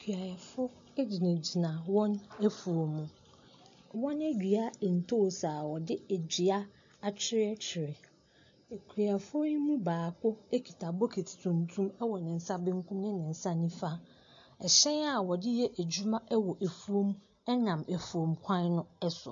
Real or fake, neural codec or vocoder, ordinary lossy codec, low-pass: real; none; AAC, 64 kbps; 7.2 kHz